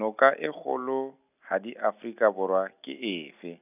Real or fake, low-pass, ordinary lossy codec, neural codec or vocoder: real; 3.6 kHz; none; none